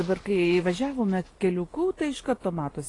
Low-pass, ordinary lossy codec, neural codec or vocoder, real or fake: 10.8 kHz; AAC, 32 kbps; none; real